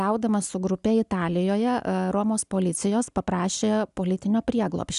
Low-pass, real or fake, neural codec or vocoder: 10.8 kHz; real; none